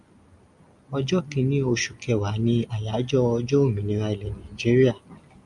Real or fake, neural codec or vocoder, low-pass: real; none; 10.8 kHz